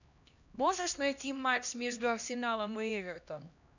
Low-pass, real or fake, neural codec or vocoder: 7.2 kHz; fake; codec, 16 kHz, 1 kbps, X-Codec, HuBERT features, trained on LibriSpeech